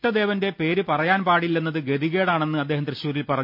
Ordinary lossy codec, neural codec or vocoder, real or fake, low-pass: MP3, 48 kbps; none; real; 5.4 kHz